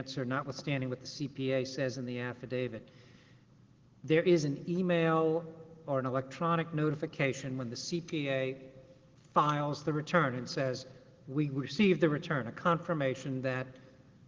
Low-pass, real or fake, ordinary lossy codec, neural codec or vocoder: 7.2 kHz; real; Opus, 16 kbps; none